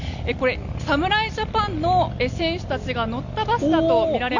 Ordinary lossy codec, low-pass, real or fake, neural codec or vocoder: none; 7.2 kHz; real; none